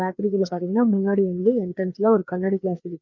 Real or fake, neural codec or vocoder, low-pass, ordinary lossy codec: fake; codec, 16 kHz, 2 kbps, FreqCodec, larger model; 7.2 kHz; none